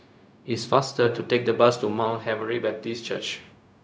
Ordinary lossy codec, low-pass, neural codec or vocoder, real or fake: none; none; codec, 16 kHz, 0.4 kbps, LongCat-Audio-Codec; fake